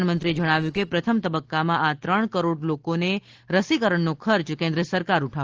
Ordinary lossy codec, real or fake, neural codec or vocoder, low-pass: Opus, 16 kbps; real; none; 7.2 kHz